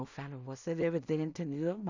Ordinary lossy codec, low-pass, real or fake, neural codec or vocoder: none; 7.2 kHz; fake; codec, 16 kHz in and 24 kHz out, 0.4 kbps, LongCat-Audio-Codec, two codebook decoder